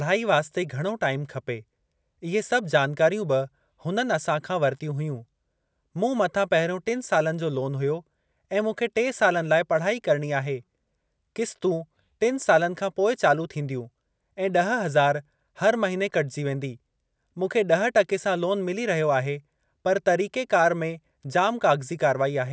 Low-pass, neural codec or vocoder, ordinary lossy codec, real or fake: none; none; none; real